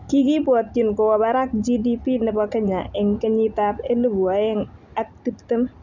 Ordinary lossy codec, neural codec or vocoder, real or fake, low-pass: none; none; real; 7.2 kHz